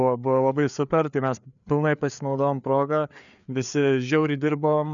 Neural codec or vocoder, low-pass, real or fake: codec, 16 kHz, 4 kbps, FreqCodec, larger model; 7.2 kHz; fake